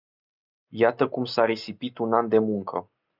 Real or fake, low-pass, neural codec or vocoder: real; 5.4 kHz; none